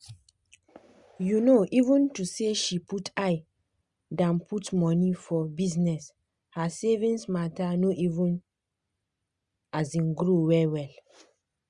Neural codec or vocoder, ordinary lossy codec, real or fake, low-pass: none; Opus, 64 kbps; real; 10.8 kHz